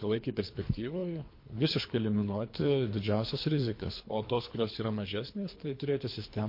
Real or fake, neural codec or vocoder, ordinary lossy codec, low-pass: fake; codec, 24 kHz, 3 kbps, HILCodec; MP3, 32 kbps; 5.4 kHz